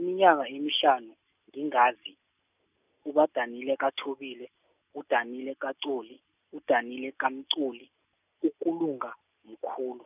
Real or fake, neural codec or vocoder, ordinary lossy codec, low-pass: real; none; none; 3.6 kHz